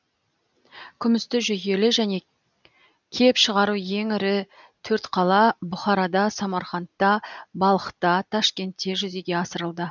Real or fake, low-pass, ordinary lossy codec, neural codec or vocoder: real; 7.2 kHz; none; none